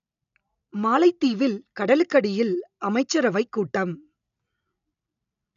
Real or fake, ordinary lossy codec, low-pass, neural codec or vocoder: real; none; 7.2 kHz; none